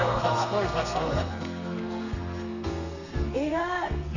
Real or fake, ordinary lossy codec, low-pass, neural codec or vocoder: fake; none; 7.2 kHz; codec, 32 kHz, 1.9 kbps, SNAC